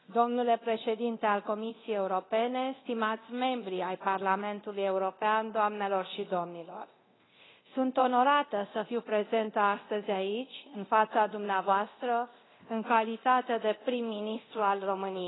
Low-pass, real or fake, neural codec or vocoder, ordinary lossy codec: 7.2 kHz; fake; codec, 24 kHz, 0.9 kbps, DualCodec; AAC, 16 kbps